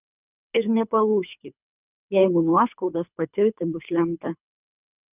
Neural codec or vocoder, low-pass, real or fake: codec, 24 kHz, 3 kbps, HILCodec; 3.6 kHz; fake